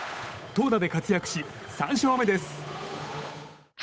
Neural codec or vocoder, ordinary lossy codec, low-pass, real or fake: codec, 16 kHz, 8 kbps, FunCodec, trained on Chinese and English, 25 frames a second; none; none; fake